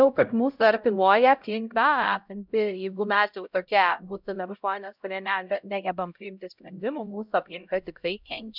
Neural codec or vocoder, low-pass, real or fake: codec, 16 kHz, 0.5 kbps, X-Codec, HuBERT features, trained on LibriSpeech; 5.4 kHz; fake